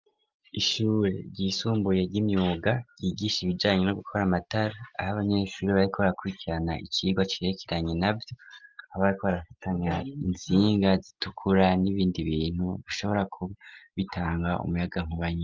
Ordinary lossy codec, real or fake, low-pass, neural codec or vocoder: Opus, 24 kbps; real; 7.2 kHz; none